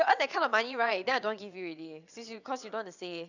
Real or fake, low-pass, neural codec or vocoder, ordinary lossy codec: fake; 7.2 kHz; vocoder, 22.05 kHz, 80 mel bands, WaveNeXt; none